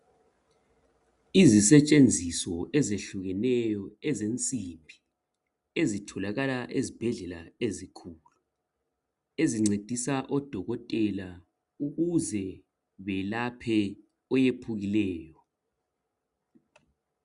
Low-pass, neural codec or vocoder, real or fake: 10.8 kHz; none; real